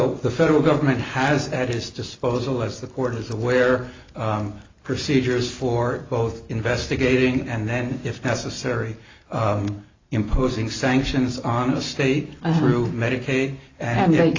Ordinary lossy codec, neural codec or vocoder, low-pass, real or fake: AAC, 32 kbps; none; 7.2 kHz; real